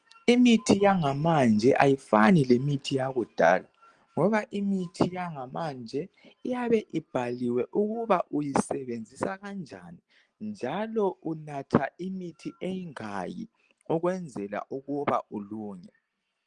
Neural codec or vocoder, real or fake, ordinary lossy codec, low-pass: none; real; Opus, 24 kbps; 9.9 kHz